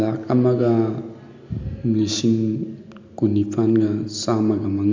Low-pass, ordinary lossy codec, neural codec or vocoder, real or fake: 7.2 kHz; MP3, 64 kbps; none; real